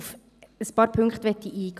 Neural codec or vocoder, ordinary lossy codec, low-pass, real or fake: vocoder, 44.1 kHz, 128 mel bands every 512 samples, BigVGAN v2; none; 14.4 kHz; fake